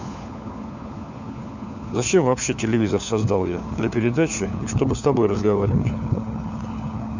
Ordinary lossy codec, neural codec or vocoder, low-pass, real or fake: none; codec, 16 kHz, 4 kbps, FunCodec, trained on LibriTTS, 50 frames a second; 7.2 kHz; fake